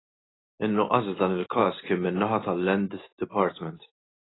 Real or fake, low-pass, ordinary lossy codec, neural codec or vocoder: real; 7.2 kHz; AAC, 16 kbps; none